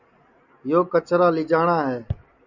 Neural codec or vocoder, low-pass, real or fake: none; 7.2 kHz; real